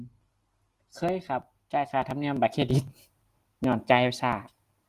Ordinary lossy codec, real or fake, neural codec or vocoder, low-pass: Opus, 24 kbps; real; none; 14.4 kHz